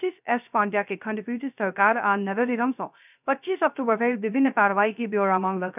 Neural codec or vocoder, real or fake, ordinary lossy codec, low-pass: codec, 16 kHz, 0.3 kbps, FocalCodec; fake; none; 3.6 kHz